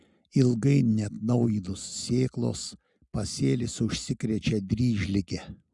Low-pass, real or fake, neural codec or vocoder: 10.8 kHz; real; none